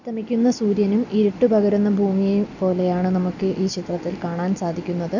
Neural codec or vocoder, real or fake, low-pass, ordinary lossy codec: none; real; 7.2 kHz; none